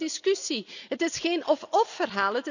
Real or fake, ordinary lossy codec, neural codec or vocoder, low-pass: real; none; none; 7.2 kHz